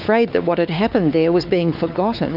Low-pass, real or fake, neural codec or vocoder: 5.4 kHz; fake; codec, 16 kHz, 4 kbps, X-Codec, WavLM features, trained on Multilingual LibriSpeech